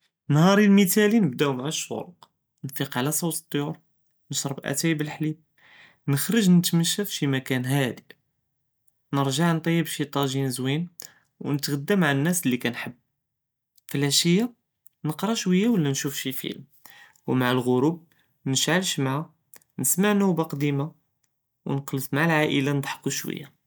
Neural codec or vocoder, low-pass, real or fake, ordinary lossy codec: none; none; real; none